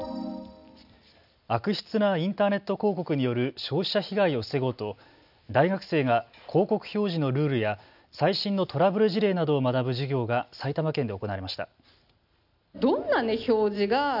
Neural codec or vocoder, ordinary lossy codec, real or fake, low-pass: none; none; real; 5.4 kHz